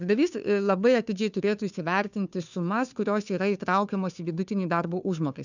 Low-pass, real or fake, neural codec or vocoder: 7.2 kHz; fake; codec, 16 kHz, 2 kbps, FunCodec, trained on Chinese and English, 25 frames a second